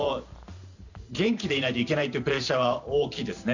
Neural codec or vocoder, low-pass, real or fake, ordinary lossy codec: vocoder, 44.1 kHz, 128 mel bands every 512 samples, BigVGAN v2; 7.2 kHz; fake; none